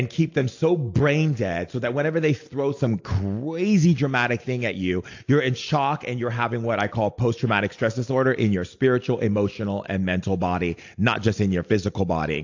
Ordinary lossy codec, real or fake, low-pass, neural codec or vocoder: AAC, 48 kbps; real; 7.2 kHz; none